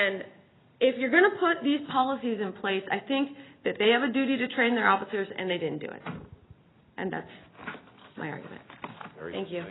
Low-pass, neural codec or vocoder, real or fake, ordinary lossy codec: 7.2 kHz; none; real; AAC, 16 kbps